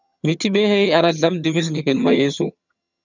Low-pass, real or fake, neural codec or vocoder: 7.2 kHz; fake; vocoder, 22.05 kHz, 80 mel bands, HiFi-GAN